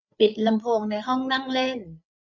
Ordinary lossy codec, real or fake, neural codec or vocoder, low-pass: none; fake; codec, 16 kHz, 8 kbps, FreqCodec, larger model; 7.2 kHz